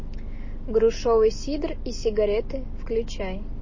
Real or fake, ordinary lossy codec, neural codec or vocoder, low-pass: real; MP3, 32 kbps; none; 7.2 kHz